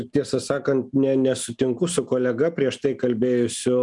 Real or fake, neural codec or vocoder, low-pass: real; none; 10.8 kHz